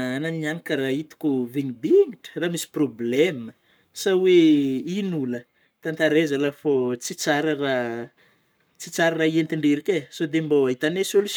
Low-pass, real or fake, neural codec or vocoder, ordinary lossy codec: none; fake; codec, 44.1 kHz, 7.8 kbps, Pupu-Codec; none